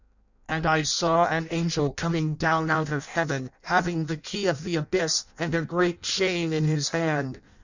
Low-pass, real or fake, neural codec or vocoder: 7.2 kHz; fake; codec, 16 kHz in and 24 kHz out, 0.6 kbps, FireRedTTS-2 codec